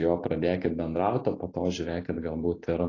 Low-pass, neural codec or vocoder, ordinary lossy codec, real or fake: 7.2 kHz; none; AAC, 32 kbps; real